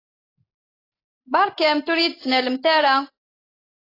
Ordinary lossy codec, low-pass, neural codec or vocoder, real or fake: AAC, 24 kbps; 5.4 kHz; none; real